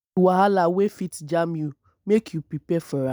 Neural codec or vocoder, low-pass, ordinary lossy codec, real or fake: none; none; none; real